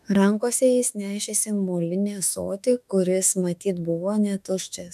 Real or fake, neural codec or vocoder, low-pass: fake; autoencoder, 48 kHz, 32 numbers a frame, DAC-VAE, trained on Japanese speech; 14.4 kHz